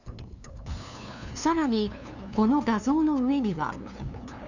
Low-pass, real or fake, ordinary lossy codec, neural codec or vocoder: 7.2 kHz; fake; none; codec, 16 kHz, 2 kbps, FunCodec, trained on LibriTTS, 25 frames a second